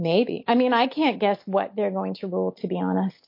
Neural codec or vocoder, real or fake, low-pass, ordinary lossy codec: none; real; 5.4 kHz; MP3, 32 kbps